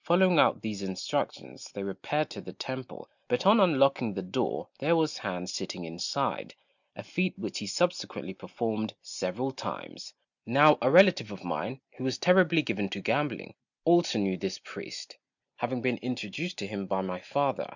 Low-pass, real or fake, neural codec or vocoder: 7.2 kHz; real; none